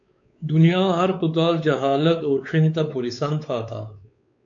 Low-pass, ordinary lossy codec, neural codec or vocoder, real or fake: 7.2 kHz; AAC, 64 kbps; codec, 16 kHz, 4 kbps, X-Codec, WavLM features, trained on Multilingual LibriSpeech; fake